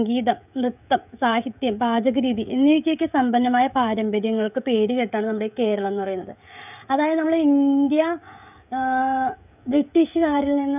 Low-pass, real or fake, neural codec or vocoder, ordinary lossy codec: 3.6 kHz; fake; codec, 16 kHz, 16 kbps, FreqCodec, smaller model; none